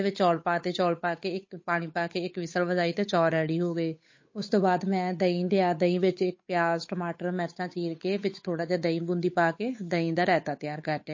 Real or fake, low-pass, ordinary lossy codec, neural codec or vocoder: fake; 7.2 kHz; MP3, 32 kbps; codec, 16 kHz, 8 kbps, FunCodec, trained on Chinese and English, 25 frames a second